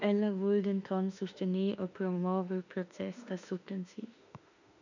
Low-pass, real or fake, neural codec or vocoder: 7.2 kHz; fake; autoencoder, 48 kHz, 32 numbers a frame, DAC-VAE, trained on Japanese speech